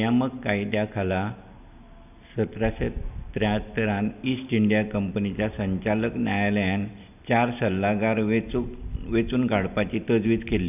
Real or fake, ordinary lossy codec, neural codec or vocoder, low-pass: real; none; none; 3.6 kHz